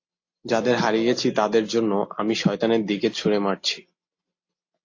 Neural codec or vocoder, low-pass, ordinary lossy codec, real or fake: none; 7.2 kHz; AAC, 48 kbps; real